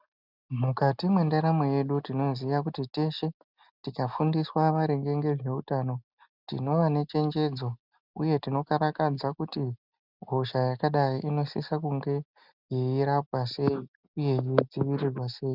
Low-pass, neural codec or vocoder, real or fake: 5.4 kHz; none; real